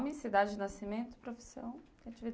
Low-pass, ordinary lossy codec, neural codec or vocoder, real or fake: none; none; none; real